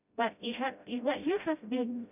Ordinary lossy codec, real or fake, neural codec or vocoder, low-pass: none; fake; codec, 16 kHz, 0.5 kbps, FreqCodec, smaller model; 3.6 kHz